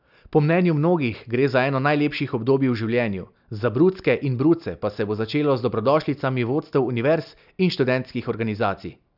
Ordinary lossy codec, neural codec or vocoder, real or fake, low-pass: none; none; real; 5.4 kHz